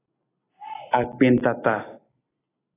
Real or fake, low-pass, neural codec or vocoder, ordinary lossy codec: real; 3.6 kHz; none; AAC, 16 kbps